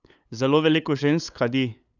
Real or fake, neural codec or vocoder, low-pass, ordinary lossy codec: fake; codec, 16 kHz, 8 kbps, FunCodec, trained on LibriTTS, 25 frames a second; 7.2 kHz; none